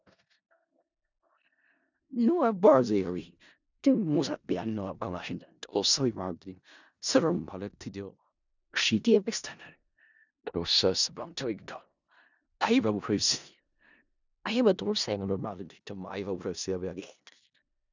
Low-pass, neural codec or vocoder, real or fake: 7.2 kHz; codec, 16 kHz in and 24 kHz out, 0.4 kbps, LongCat-Audio-Codec, four codebook decoder; fake